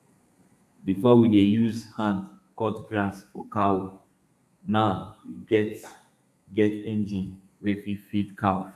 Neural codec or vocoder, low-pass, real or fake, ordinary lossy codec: codec, 32 kHz, 1.9 kbps, SNAC; 14.4 kHz; fake; none